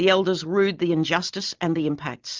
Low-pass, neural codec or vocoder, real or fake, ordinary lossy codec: 7.2 kHz; none; real; Opus, 32 kbps